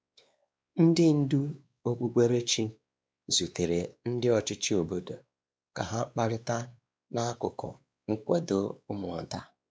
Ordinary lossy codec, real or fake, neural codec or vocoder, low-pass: none; fake; codec, 16 kHz, 2 kbps, X-Codec, WavLM features, trained on Multilingual LibriSpeech; none